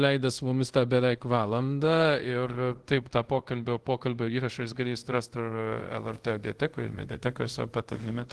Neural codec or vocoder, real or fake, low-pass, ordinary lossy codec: codec, 24 kHz, 0.5 kbps, DualCodec; fake; 10.8 kHz; Opus, 16 kbps